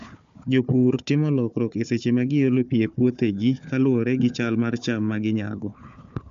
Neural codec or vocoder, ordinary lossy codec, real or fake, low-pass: codec, 16 kHz, 4 kbps, FunCodec, trained on Chinese and English, 50 frames a second; MP3, 64 kbps; fake; 7.2 kHz